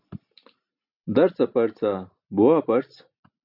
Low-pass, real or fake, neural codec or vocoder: 5.4 kHz; real; none